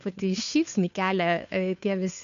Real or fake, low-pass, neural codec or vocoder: fake; 7.2 kHz; codec, 16 kHz, 2 kbps, FunCodec, trained on Chinese and English, 25 frames a second